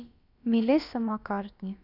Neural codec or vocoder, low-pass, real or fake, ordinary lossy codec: codec, 16 kHz, about 1 kbps, DyCAST, with the encoder's durations; 5.4 kHz; fake; none